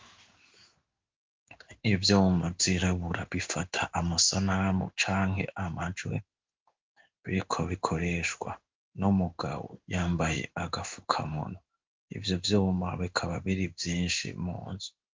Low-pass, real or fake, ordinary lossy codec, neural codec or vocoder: 7.2 kHz; fake; Opus, 32 kbps; codec, 16 kHz in and 24 kHz out, 1 kbps, XY-Tokenizer